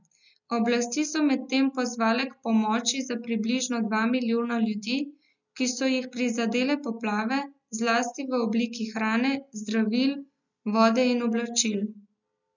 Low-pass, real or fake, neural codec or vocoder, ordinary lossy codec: 7.2 kHz; real; none; none